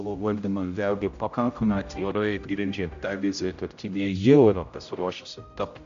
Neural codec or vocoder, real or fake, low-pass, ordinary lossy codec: codec, 16 kHz, 0.5 kbps, X-Codec, HuBERT features, trained on general audio; fake; 7.2 kHz; AAC, 64 kbps